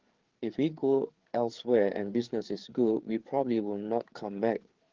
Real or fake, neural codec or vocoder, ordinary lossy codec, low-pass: fake; codec, 16 kHz, 4 kbps, FreqCodec, larger model; Opus, 16 kbps; 7.2 kHz